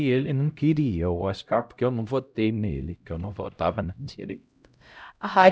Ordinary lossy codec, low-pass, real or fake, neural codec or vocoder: none; none; fake; codec, 16 kHz, 0.5 kbps, X-Codec, HuBERT features, trained on LibriSpeech